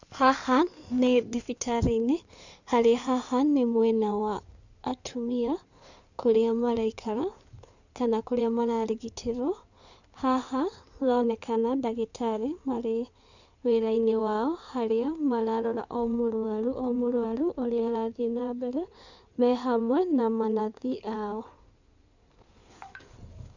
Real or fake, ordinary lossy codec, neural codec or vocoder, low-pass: fake; none; codec, 16 kHz in and 24 kHz out, 2.2 kbps, FireRedTTS-2 codec; 7.2 kHz